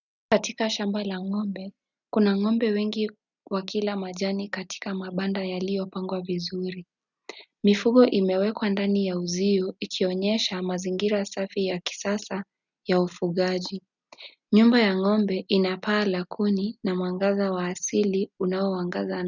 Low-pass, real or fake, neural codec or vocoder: 7.2 kHz; real; none